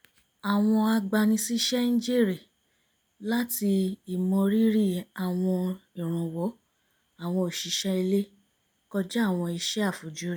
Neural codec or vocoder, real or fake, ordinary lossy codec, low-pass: none; real; none; none